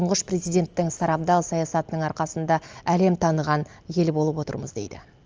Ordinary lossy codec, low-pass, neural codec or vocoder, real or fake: Opus, 24 kbps; 7.2 kHz; none; real